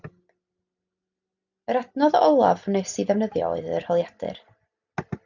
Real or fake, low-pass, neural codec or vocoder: real; 7.2 kHz; none